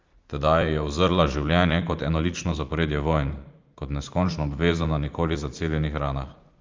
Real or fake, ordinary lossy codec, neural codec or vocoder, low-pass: fake; Opus, 32 kbps; vocoder, 44.1 kHz, 80 mel bands, Vocos; 7.2 kHz